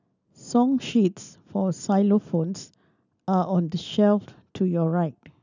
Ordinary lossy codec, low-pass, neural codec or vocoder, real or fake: none; 7.2 kHz; none; real